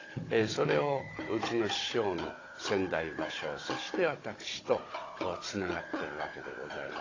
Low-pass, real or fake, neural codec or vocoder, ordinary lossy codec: 7.2 kHz; fake; codec, 16 kHz, 16 kbps, FunCodec, trained on Chinese and English, 50 frames a second; AAC, 32 kbps